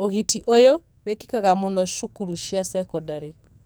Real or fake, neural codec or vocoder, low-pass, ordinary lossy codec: fake; codec, 44.1 kHz, 2.6 kbps, SNAC; none; none